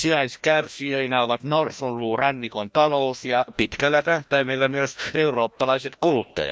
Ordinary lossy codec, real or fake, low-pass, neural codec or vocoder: none; fake; none; codec, 16 kHz, 1 kbps, FreqCodec, larger model